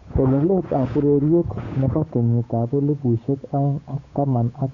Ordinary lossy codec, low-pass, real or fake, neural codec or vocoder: none; 7.2 kHz; fake; codec, 16 kHz, 8 kbps, FunCodec, trained on Chinese and English, 25 frames a second